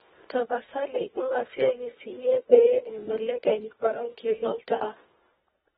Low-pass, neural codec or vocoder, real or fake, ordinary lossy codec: 10.8 kHz; codec, 24 kHz, 1.5 kbps, HILCodec; fake; AAC, 16 kbps